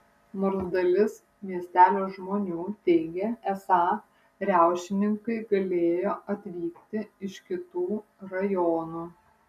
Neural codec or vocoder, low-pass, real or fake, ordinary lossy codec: none; 14.4 kHz; real; MP3, 96 kbps